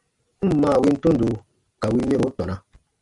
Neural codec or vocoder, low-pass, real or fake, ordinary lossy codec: none; 10.8 kHz; real; AAC, 64 kbps